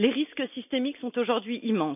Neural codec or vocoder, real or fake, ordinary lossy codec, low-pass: none; real; none; 3.6 kHz